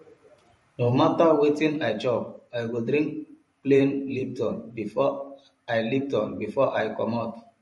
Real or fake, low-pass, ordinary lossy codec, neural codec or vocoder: fake; 19.8 kHz; MP3, 48 kbps; vocoder, 44.1 kHz, 128 mel bands every 512 samples, BigVGAN v2